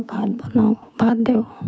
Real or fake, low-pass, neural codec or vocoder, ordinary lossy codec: fake; none; codec, 16 kHz, 6 kbps, DAC; none